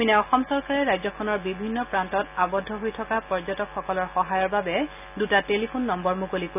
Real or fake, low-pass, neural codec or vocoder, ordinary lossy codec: real; 3.6 kHz; none; none